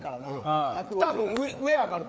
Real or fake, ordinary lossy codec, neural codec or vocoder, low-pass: fake; none; codec, 16 kHz, 4 kbps, FreqCodec, larger model; none